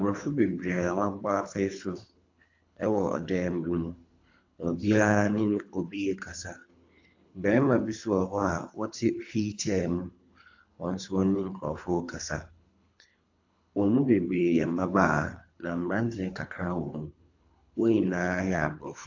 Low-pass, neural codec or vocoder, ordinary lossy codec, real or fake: 7.2 kHz; codec, 24 kHz, 3 kbps, HILCodec; AAC, 48 kbps; fake